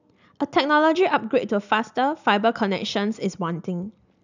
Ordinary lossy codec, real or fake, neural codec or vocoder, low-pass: none; real; none; 7.2 kHz